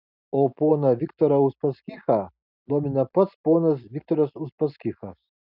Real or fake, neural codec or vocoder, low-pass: real; none; 5.4 kHz